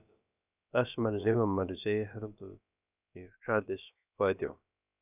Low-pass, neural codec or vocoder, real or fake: 3.6 kHz; codec, 16 kHz, about 1 kbps, DyCAST, with the encoder's durations; fake